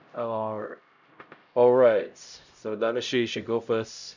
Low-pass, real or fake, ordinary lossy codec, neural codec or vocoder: 7.2 kHz; fake; none; codec, 16 kHz, 0.5 kbps, X-Codec, HuBERT features, trained on LibriSpeech